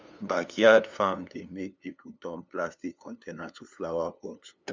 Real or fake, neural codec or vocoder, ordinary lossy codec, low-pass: fake; codec, 16 kHz, 2 kbps, FunCodec, trained on LibriTTS, 25 frames a second; none; none